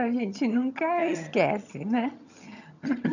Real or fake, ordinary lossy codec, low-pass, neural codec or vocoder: fake; none; 7.2 kHz; vocoder, 22.05 kHz, 80 mel bands, HiFi-GAN